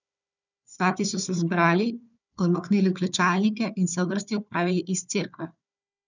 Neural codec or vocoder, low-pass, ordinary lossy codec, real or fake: codec, 16 kHz, 4 kbps, FunCodec, trained on Chinese and English, 50 frames a second; 7.2 kHz; none; fake